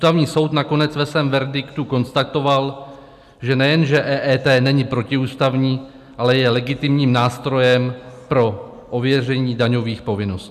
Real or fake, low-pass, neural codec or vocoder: real; 14.4 kHz; none